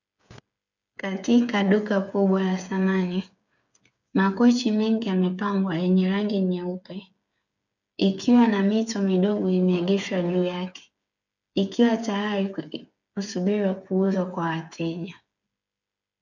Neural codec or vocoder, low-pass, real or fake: codec, 16 kHz, 8 kbps, FreqCodec, smaller model; 7.2 kHz; fake